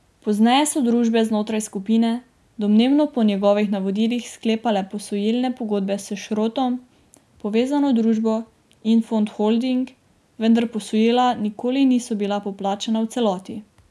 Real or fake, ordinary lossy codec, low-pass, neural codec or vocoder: real; none; none; none